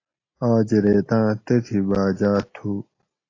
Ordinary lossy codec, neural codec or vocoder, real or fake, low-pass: AAC, 32 kbps; none; real; 7.2 kHz